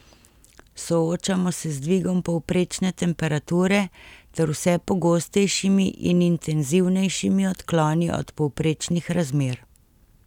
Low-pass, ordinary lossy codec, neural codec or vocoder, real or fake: 19.8 kHz; none; none; real